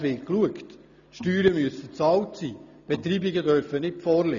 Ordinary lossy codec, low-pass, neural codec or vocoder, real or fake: none; 7.2 kHz; none; real